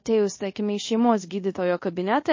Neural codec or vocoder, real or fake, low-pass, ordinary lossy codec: codec, 24 kHz, 0.5 kbps, DualCodec; fake; 7.2 kHz; MP3, 32 kbps